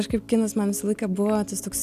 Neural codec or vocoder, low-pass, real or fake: none; 14.4 kHz; real